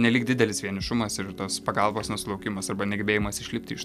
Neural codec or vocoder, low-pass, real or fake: none; 14.4 kHz; real